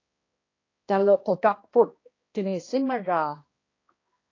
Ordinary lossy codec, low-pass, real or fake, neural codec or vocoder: AAC, 32 kbps; 7.2 kHz; fake; codec, 16 kHz, 1 kbps, X-Codec, HuBERT features, trained on balanced general audio